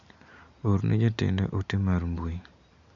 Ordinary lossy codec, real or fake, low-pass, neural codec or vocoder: MP3, 64 kbps; real; 7.2 kHz; none